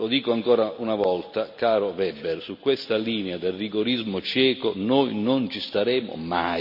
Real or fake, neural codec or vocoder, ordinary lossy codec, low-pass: real; none; none; 5.4 kHz